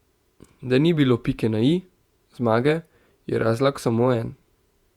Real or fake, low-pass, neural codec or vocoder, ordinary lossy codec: fake; 19.8 kHz; vocoder, 48 kHz, 128 mel bands, Vocos; Opus, 64 kbps